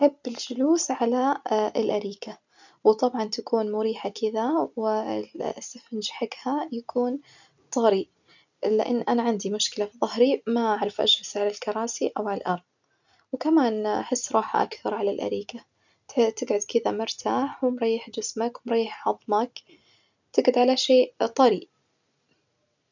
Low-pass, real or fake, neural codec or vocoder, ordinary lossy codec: 7.2 kHz; real; none; none